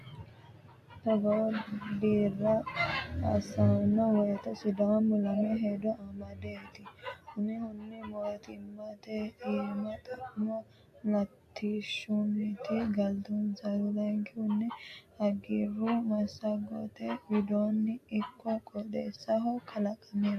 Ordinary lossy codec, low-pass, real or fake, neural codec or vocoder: AAC, 64 kbps; 14.4 kHz; real; none